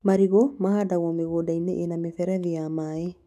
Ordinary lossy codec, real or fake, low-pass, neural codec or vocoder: none; fake; 14.4 kHz; autoencoder, 48 kHz, 128 numbers a frame, DAC-VAE, trained on Japanese speech